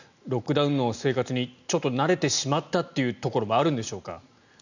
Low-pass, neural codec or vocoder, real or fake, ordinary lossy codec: 7.2 kHz; none; real; none